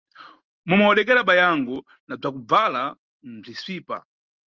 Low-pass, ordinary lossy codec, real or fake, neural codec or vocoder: 7.2 kHz; Opus, 32 kbps; real; none